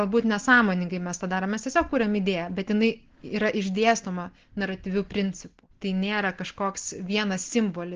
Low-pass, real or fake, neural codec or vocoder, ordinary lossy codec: 7.2 kHz; real; none; Opus, 16 kbps